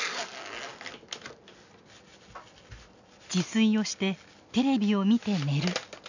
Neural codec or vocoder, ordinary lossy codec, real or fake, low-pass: none; none; real; 7.2 kHz